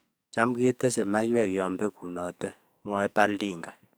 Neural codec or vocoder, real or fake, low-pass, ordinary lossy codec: codec, 44.1 kHz, 2.6 kbps, SNAC; fake; none; none